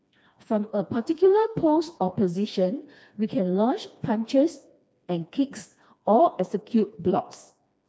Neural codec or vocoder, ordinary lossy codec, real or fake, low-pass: codec, 16 kHz, 2 kbps, FreqCodec, smaller model; none; fake; none